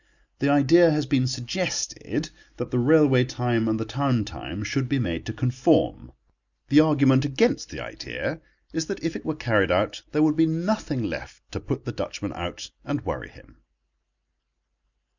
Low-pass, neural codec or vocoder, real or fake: 7.2 kHz; none; real